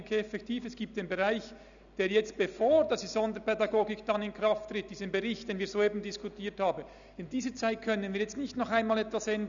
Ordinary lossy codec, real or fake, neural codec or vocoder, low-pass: none; real; none; 7.2 kHz